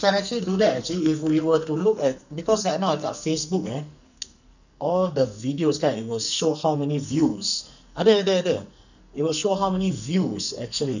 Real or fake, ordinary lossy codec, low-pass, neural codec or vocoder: fake; none; 7.2 kHz; codec, 44.1 kHz, 2.6 kbps, SNAC